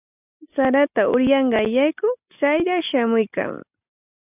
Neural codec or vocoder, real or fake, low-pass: none; real; 3.6 kHz